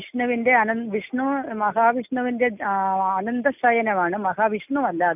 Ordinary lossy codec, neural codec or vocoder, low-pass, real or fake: none; none; 3.6 kHz; real